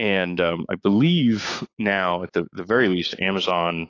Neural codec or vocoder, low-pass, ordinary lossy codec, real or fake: codec, 16 kHz, 6 kbps, DAC; 7.2 kHz; AAC, 32 kbps; fake